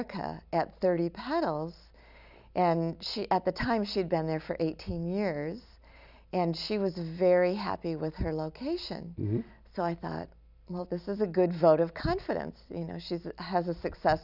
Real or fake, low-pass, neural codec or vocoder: real; 5.4 kHz; none